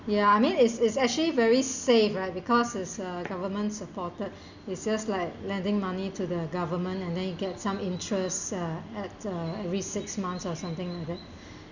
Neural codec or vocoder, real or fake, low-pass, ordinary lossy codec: none; real; 7.2 kHz; none